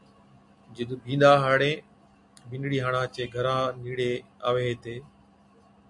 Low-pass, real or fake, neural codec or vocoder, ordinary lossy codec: 10.8 kHz; real; none; MP3, 64 kbps